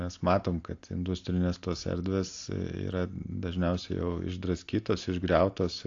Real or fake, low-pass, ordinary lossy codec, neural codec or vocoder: real; 7.2 kHz; AAC, 48 kbps; none